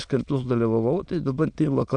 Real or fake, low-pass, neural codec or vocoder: fake; 9.9 kHz; autoencoder, 22.05 kHz, a latent of 192 numbers a frame, VITS, trained on many speakers